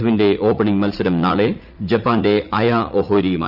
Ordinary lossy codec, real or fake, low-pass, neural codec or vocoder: none; fake; 5.4 kHz; vocoder, 44.1 kHz, 80 mel bands, Vocos